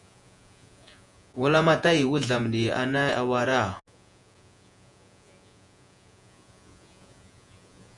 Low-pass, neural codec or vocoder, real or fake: 10.8 kHz; vocoder, 48 kHz, 128 mel bands, Vocos; fake